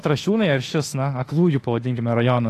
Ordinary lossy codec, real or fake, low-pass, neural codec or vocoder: AAC, 48 kbps; fake; 14.4 kHz; autoencoder, 48 kHz, 32 numbers a frame, DAC-VAE, trained on Japanese speech